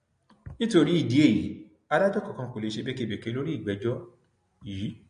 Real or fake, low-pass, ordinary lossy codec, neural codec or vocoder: real; 9.9 kHz; MP3, 48 kbps; none